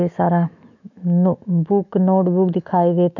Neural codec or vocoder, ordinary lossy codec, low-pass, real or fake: autoencoder, 48 kHz, 128 numbers a frame, DAC-VAE, trained on Japanese speech; none; 7.2 kHz; fake